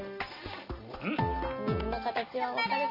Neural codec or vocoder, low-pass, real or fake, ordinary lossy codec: none; 5.4 kHz; real; none